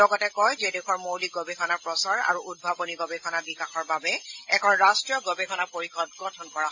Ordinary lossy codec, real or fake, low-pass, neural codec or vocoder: AAC, 48 kbps; real; 7.2 kHz; none